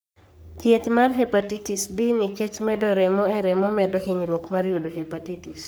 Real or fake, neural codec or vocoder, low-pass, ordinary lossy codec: fake; codec, 44.1 kHz, 3.4 kbps, Pupu-Codec; none; none